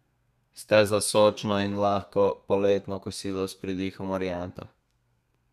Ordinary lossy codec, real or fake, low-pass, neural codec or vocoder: none; fake; 14.4 kHz; codec, 32 kHz, 1.9 kbps, SNAC